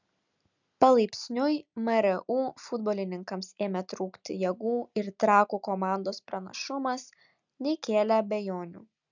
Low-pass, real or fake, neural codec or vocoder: 7.2 kHz; real; none